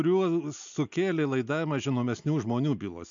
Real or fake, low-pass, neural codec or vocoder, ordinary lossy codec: real; 7.2 kHz; none; AAC, 64 kbps